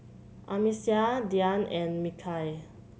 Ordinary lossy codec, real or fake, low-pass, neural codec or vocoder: none; real; none; none